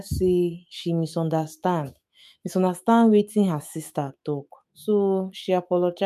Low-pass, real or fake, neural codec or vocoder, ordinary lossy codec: 14.4 kHz; fake; autoencoder, 48 kHz, 128 numbers a frame, DAC-VAE, trained on Japanese speech; MP3, 64 kbps